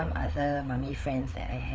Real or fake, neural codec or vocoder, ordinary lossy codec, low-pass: fake; codec, 16 kHz, 4 kbps, FreqCodec, larger model; none; none